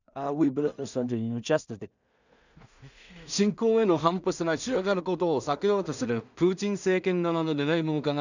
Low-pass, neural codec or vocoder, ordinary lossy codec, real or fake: 7.2 kHz; codec, 16 kHz in and 24 kHz out, 0.4 kbps, LongCat-Audio-Codec, two codebook decoder; none; fake